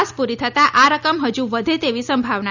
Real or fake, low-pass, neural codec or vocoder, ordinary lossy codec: real; 7.2 kHz; none; none